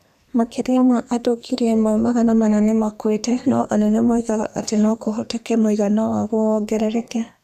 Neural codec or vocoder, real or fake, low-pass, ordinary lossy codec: codec, 32 kHz, 1.9 kbps, SNAC; fake; 14.4 kHz; none